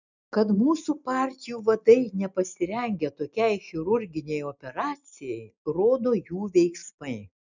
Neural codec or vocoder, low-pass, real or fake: none; 7.2 kHz; real